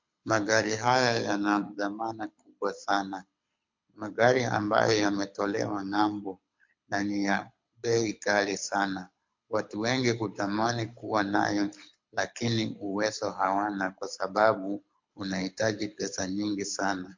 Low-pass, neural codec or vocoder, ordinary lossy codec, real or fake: 7.2 kHz; codec, 24 kHz, 6 kbps, HILCodec; MP3, 48 kbps; fake